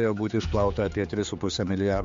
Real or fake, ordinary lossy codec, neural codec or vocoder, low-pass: fake; MP3, 48 kbps; codec, 16 kHz, 4 kbps, X-Codec, HuBERT features, trained on general audio; 7.2 kHz